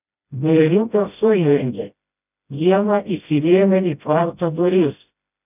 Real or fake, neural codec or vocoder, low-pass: fake; codec, 16 kHz, 0.5 kbps, FreqCodec, smaller model; 3.6 kHz